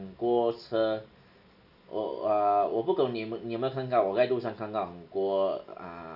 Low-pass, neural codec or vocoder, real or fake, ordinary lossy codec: 5.4 kHz; none; real; none